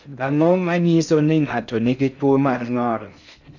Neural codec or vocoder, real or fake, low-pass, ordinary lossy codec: codec, 16 kHz in and 24 kHz out, 0.6 kbps, FocalCodec, streaming, 2048 codes; fake; 7.2 kHz; none